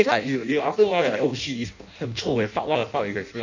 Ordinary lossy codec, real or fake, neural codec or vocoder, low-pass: none; fake; codec, 16 kHz in and 24 kHz out, 0.6 kbps, FireRedTTS-2 codec; 7.2 kHz